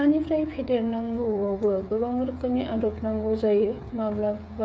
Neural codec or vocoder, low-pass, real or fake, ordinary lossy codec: codec, 16 kHz, 8 kbps, FreqCodec, smaller model; none; fake; none